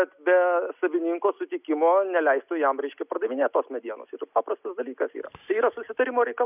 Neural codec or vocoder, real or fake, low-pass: none; real; 3.6 kHz